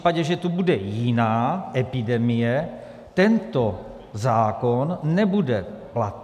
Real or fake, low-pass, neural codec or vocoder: real; 14.4 kHz; none